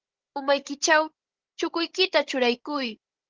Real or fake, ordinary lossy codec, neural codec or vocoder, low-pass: fake; Opus, 16 kbps; codec, 16 kHz, 16 kbps, FunCodec, trained on Chinese and English, 50 frames a second; 7.2 kHz